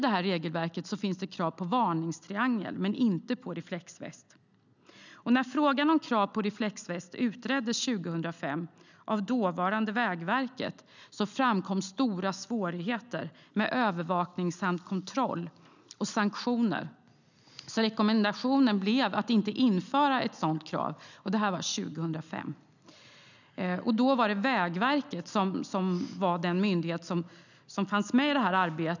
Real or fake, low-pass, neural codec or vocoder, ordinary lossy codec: real; 7.2 kHz; none; none